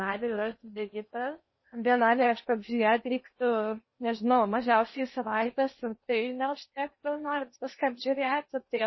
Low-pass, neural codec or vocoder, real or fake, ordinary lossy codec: 7.2 kHz; codec, 16 kHz in and 24 kHz out, 0.8 kbps, FocalCodec, streaming, 65536 codes; fake; MP3, 24 kbps